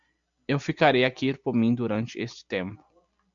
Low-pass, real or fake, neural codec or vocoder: 7.2 kHz; real; none